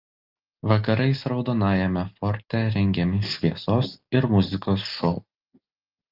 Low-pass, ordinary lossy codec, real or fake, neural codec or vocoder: 5.4 kHz; Opus, 32 kbps; real; none